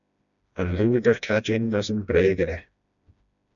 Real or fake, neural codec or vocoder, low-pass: fake; codec, 16 kHz, 1 kbps, FreqCodec, smaller model; 7.2 kHz